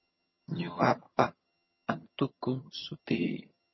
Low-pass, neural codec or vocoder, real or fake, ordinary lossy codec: 7.2 kHz; vocoder, 22.05 kHz, 80 mel bands, HiFi-GAN; fake; MP3, 24 kbps